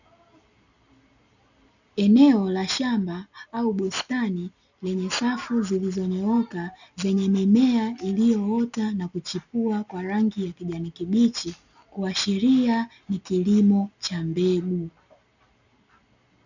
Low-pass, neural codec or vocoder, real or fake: 7.2 kHz; none; real